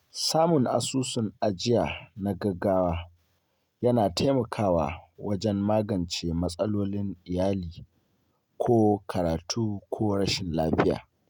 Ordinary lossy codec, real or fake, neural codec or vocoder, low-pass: none; real; none; 19.8 kHz